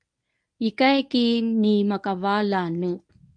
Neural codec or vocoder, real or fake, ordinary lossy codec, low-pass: codec, 24 kHz, 0.9 kbps, WavTokenizer, medium speech release version 1; fake; MP3, 64 kbps; 9.9 kHz